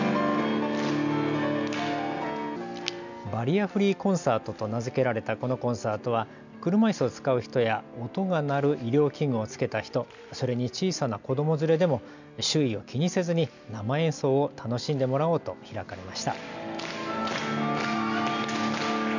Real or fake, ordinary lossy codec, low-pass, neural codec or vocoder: real; none; 7.2 kHz; none